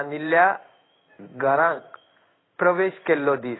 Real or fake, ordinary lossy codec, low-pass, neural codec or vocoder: fake; AAC, 16 kbps; 7.2 kHz; codec, 16 kHz in and 24 kHz out, 1 kbps, XY-Tokenizer